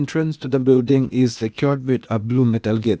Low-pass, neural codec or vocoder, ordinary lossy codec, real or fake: none; codec, 16 kHz, 0.8 kbps, ZipCodec; none; fake